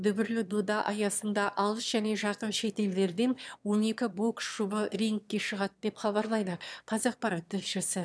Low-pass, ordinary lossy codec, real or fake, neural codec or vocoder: none; none; fake; autoencoder, 22.05 kHz, a latent of 192 numbers a frame, VITS, trained on one speaker